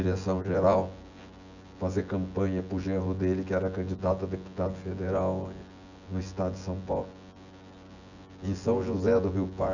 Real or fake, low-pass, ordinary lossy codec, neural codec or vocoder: fake; 7.2 kHz; none; vocoder, 24 kHz, 100 mel bands, Vocos